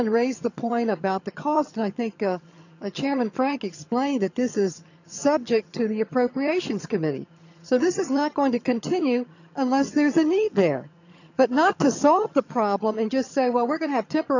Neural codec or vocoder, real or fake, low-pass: vocoder, 22.05 kHz, 80 mel bands, HiFi-GAN; fake; 7.2 kHz